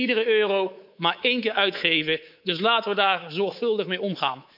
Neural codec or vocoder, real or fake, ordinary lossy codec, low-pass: codec, 24 kHz, 3.1 kbps, DualCodec; fake; none; 5.4 kHz